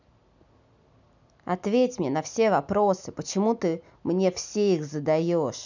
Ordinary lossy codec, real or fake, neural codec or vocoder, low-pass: none; real; none; 7.2 kHz